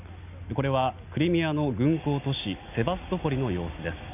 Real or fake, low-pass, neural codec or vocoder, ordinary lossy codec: real; 3.6 kHz; none; none